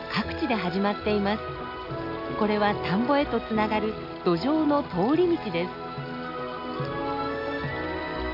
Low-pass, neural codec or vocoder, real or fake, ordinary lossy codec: 5.4 kHz; none; real; none